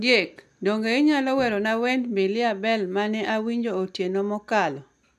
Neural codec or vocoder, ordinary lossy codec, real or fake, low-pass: none; none; real; 14.4 kHz